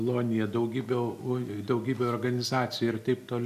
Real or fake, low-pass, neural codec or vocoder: real; 14.4 kHz; none